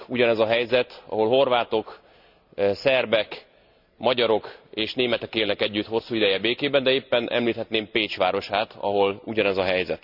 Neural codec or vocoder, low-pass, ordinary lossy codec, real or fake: none; 5.4 kHz; none; real